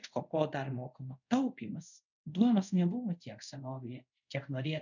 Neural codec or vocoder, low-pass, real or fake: codec, 24 kHz, 0.5 kbps, DualCodec; 7.2 kHz; fake